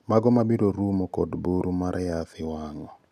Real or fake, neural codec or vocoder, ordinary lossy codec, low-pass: real; none; none; 14.4 kHz